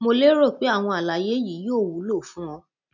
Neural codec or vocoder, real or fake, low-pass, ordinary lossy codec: none; real; 7.2 kHz; none